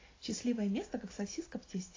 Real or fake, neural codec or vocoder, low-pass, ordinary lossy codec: real; none; 7.2 kHz; AAC, 32 kbps